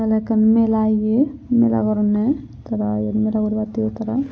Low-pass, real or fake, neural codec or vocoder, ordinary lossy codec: none; real; none; none